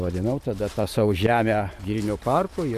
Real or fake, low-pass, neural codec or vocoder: fake; 14.4 kHz; vocoder, 44.1 kHz, 128 mel bands every 512 samples, BigVGAN v2